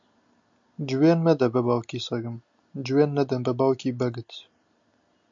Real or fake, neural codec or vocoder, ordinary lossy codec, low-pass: real; none; AAC, 64 kbps; 7.2 kHz